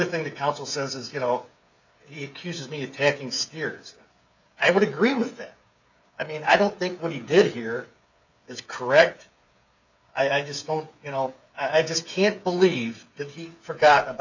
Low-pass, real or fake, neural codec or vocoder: 7.2 kHz; fake; codec, 16 kHz, 8 kbps, FreqCodec, smaller model